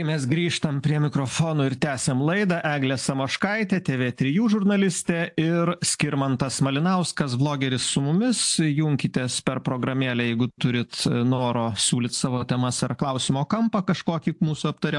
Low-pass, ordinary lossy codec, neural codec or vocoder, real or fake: 10.8 kHz; AAC, 64 kbps; none; real